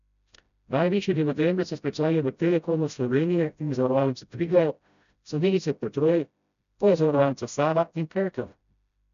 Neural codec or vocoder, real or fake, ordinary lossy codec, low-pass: codec, 16 kHz, 0.5 kbps, FreqCodec, smaller model; fake; none; 7.2 kHz